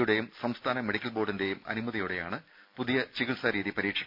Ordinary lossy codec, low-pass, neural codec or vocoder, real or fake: none; 5.4 kHz; none; real